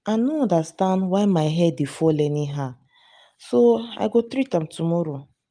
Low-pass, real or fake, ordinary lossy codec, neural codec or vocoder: 9.9 kHz; real; none; none